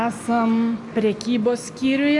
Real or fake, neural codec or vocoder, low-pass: real; none; 10.8 kHz